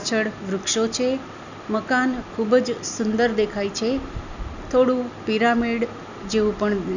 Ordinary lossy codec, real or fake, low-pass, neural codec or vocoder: none; real; 7.2 kHz; none